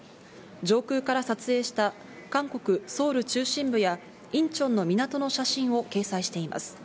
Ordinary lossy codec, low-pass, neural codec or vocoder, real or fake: none; none; none; real